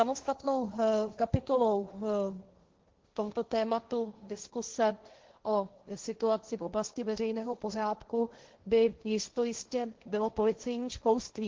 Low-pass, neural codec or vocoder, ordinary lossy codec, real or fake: 7.2 kHz; codec, 16 kHz, 1.1 kbps, Voila-Tokenizer; Opus, 16 kbps; fake